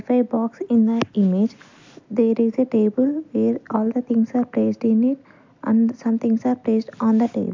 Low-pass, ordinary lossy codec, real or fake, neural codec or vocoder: 7.2 kHz; MP3, 64 kbps; real; none